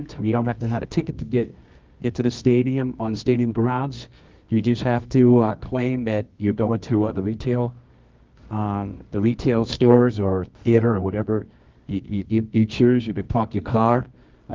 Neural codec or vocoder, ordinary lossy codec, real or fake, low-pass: codec, 24 kHz, 0.9 kbps, WavTokenizer, medium music audio release; Opus, 32 kbps; fake; 7.2 kHz